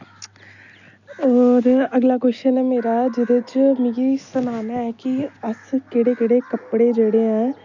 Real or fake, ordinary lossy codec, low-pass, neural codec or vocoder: real; none; 7.2 kHz; none